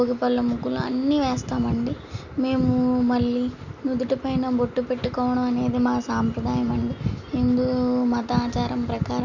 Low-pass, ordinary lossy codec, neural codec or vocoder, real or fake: 7.2 kHz; none; none; real